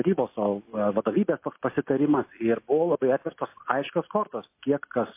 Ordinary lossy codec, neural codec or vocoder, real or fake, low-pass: MP3, 24 kbps; none; real; 3.6 kHz